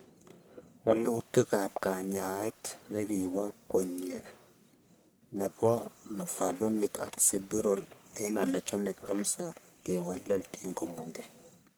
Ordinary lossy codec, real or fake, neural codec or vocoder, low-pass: none; fake; codec, 44.1 kHz, 1.7 kbps, Pupu-Codec; none